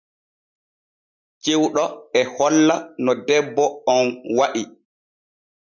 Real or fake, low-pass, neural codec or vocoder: real; 7.2 kHz; none